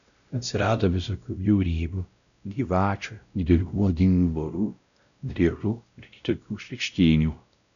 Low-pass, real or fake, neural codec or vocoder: 7.2 kHz; fake; codec, 16 kHz, 0.5 kbps, X-Codec, WavLM features, trained on Multilingual LibriSpeech